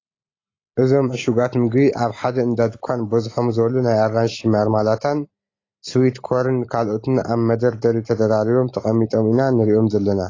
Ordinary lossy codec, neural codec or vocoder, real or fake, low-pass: AAC, 32 kbps; none; real; 7.2 kHz